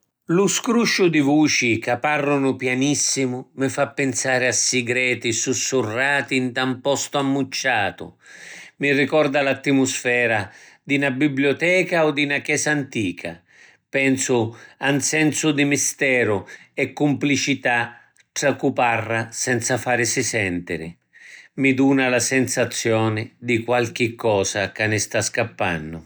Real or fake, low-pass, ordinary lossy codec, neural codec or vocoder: real; none; none; none